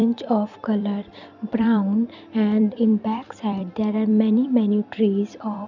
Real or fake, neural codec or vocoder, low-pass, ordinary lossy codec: fake; vocoder, 44.1 kHz, 128 mel bands every 256 samples, BigVGAN v2; 7.2 kHz; none